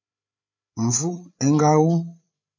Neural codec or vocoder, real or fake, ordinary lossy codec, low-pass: codec, 16 kHz, 8 kbps, FreqCodec, larger model; fake; MP3, 48 kbps; 7.2 kHz